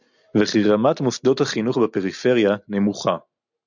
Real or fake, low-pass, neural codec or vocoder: real; 7.2 kHz; none